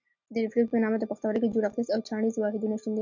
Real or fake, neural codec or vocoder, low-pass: real; none; 7.2 kHz